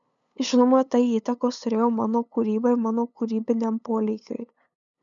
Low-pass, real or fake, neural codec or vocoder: 7.2 kHz; fake; codec, 16 kHz, 8 kbps, FunCodec, trained on LibriTTS, 25 frames a second